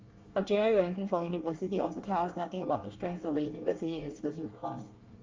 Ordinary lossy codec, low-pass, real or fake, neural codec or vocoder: Opus, 32 kbps; 7.2 kHz; fake; codec, 24 kHz, 1 kbps, SNAC